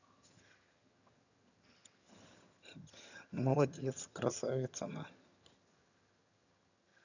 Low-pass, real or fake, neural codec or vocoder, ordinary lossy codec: 7.2 kHz; fake; vocoder, 22.05 kHz, 80 mel bands, HiFi-GAN; none